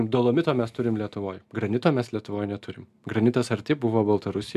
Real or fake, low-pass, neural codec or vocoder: real; 14.4 kHz; none